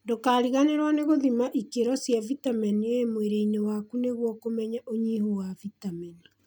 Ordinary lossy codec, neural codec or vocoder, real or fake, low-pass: none; none; real; none